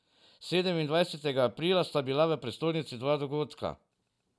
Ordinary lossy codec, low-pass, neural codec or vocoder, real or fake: none; none; none; real